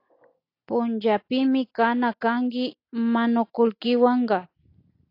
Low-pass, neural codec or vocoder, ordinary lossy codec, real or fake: 5.4 kHz; none; AAC, 32 kbps; real